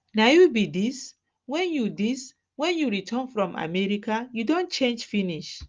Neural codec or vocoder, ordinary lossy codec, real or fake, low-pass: none; Opus, 24 kbps; real; 7.2 kHz